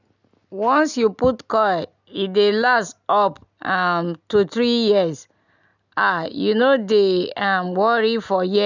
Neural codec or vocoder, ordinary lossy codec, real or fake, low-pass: none; none; real; 7.2 kHz